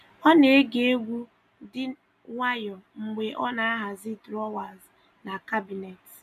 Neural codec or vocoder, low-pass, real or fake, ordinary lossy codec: none; 14.4 kHz; real; none